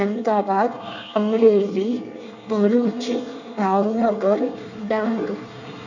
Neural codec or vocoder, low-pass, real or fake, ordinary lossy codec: codec, 24 kHz, 1 kbps, SNAC; 7.2 kHz; fake; none